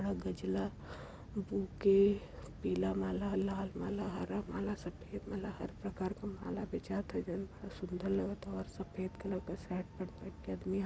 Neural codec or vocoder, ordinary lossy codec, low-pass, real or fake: none; none; none; real